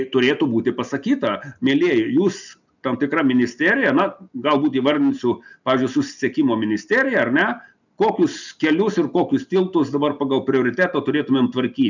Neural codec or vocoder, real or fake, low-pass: none; real; 7.2 kHz